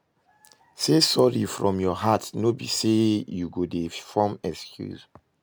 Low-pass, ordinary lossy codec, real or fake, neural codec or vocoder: none; none; real; none